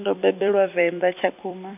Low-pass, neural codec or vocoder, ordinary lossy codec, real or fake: 3.6 kHz; vocoder, 44.1 kHz, 128 mel bands every 256 samples, BigVGAN v2; none; fake